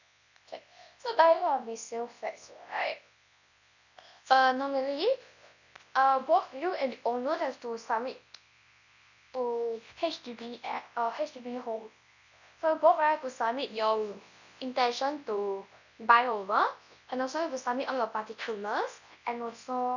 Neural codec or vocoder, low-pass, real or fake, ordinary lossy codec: codec, 24 kHz, 0.9 kbps, WavTokenizer, large speech release; 7.2 kHz; fake; none